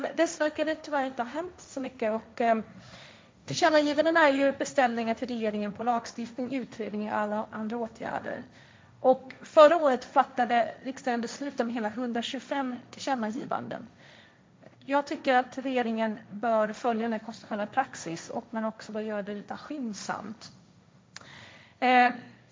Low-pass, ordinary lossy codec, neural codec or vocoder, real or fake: none; none; codec, 16 kHz, 1.1 kbps, Voila-Tokenizer; fake